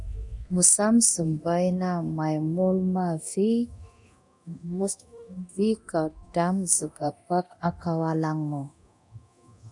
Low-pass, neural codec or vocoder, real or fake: 10.8 kHz; codec, 24 kHz, 0.9 kbps, DualCodec; fake